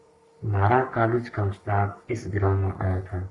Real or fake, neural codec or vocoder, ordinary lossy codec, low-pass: fake; codec, 44.1 kHz, 3.4 kbps, Pupu-Codec; Opus, 64 kbps; 10.8 kHz